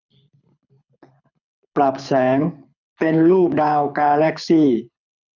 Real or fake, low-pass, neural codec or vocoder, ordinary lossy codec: fake; 7.2 kHz; codec, 24 kHz, 6 kbps, HILCodec; none